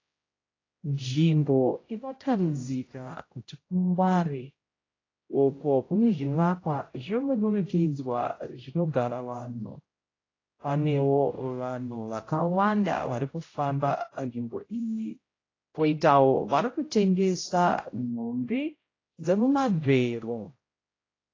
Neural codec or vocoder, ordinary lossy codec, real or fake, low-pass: codec, 16 kHz, 0.5 kbps, X-Codec, HuBERT features, trained on general audio; AAC, 32 kbps; fake; 7.2 kHz